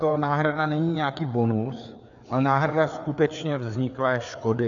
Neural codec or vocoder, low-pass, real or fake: codec, 16 kHz, 4 kbps, FreqCodec, larger model; 7.2 kHz; fake